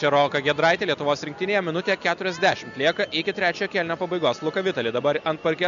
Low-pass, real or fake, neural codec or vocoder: 7.2 kHz; real; none